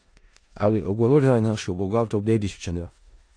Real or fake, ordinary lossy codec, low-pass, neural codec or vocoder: fake; AAC, 48 kbps; 9.9 kHz; codec, 16 kHz in and 24 kHz out, 0.4 kbps, LongCat-Audio-Codec, four codebook decoder